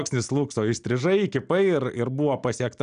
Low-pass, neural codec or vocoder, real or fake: 9.9 kHz; none; real